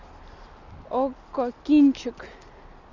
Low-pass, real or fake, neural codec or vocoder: 7.2 kHz; real; none